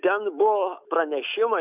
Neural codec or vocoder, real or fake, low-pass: autoencoder, 48 kHz, 128 numbers a frame, DAC-VAE, trained on Japanese speech; fake; 3.6 kHz